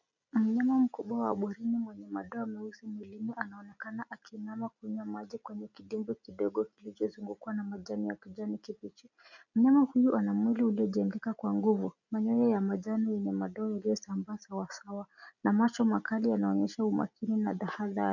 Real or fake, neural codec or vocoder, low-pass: real; none; 7.2 kHz